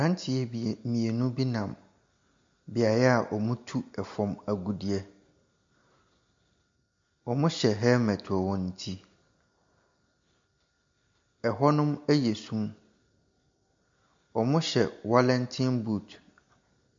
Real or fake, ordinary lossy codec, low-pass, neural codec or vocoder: real; MP3, 48 kbps; 7.2 kHz; none